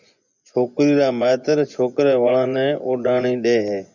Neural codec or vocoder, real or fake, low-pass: vocoder, 24 kHz, 100 mel bands, Vocos; fake; 7.2 kHz